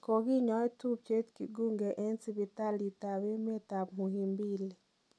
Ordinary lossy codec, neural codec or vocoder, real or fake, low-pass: none; none; real; none